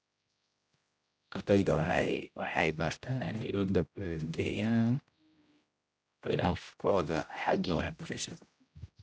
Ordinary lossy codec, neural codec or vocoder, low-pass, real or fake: none; codec, 16 kHz, 0.5 kbps, X-Codec, HuBERT features, trained on general audio; none; fake